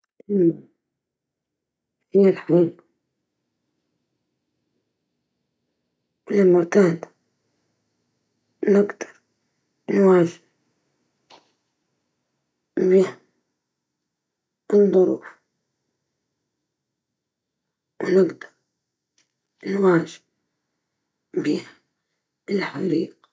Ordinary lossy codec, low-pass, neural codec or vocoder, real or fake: none; none; none; real